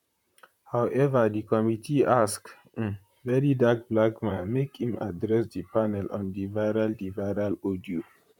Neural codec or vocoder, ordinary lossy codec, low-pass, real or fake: vocoder, 44.1 kHz, 128 mel bands, Pupu-Vocoder; none; 19.8 kHz; fake